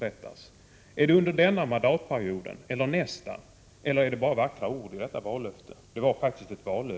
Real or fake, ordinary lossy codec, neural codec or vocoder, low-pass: real; none; none; none